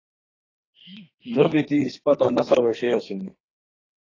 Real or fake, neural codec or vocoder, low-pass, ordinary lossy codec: fake; codec, 32 kHz, 1.9 kbps, SNAC; 7.2 kHz; AAC, 32 kbps